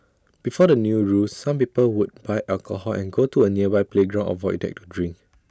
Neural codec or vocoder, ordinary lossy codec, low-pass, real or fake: none; none; none; real